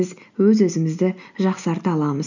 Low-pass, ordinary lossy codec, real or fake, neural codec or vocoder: 7.2 kHz; none; real; none